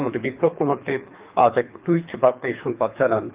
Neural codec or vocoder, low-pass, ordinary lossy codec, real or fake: codec, 24 kHz, 3 kbps, HILCodec; 3.6 kHz; Opus, 24 kbps; fake